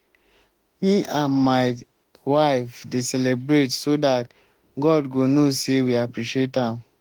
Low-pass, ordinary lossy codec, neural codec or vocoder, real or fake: 19.8 kHz; Opus, 24 kbps; autoencoder, 48 kHz, 32 numbers a frame, DAC-VAE, trained on Japanese speech; fake